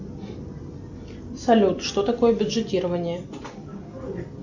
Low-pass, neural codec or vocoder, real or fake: 7.2 kHz; none; real